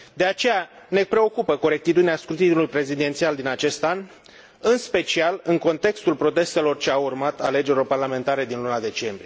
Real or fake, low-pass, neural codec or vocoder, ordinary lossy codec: real; none; none; none